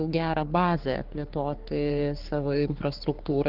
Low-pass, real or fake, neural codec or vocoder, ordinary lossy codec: 5.4 kHz; fake; codec, 44.1 kHz, 3.4 kbps, Pupu-Codec; Opus, 16 kbps